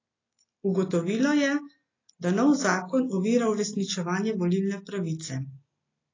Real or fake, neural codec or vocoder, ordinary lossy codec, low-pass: real; none; AAC, 32 kbps; 7.2 kHz